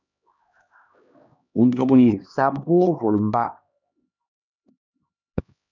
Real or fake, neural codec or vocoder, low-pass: fake; codec, 16 kHz, 1 kbps, X-Codec, HuBERT features, trained on LibriSpeech; 7.2 kHz